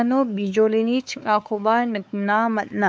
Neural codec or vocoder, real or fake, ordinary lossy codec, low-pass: codec, 16 kHz, 4 kbps, X-Codec, WavLM features, trained on Multilingual LibriSpeech; fake; none; none